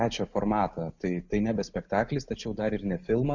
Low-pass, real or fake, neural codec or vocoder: 7.2 kHz; real; none